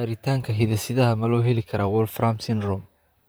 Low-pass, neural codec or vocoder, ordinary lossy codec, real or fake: none; vocoder, 44.1 kHz, 128 mel bands, Pupu-Vocoder; none; fake